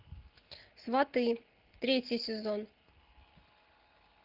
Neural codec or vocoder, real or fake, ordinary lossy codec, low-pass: none; real; Opus, 24 kbps; 5.4 kHz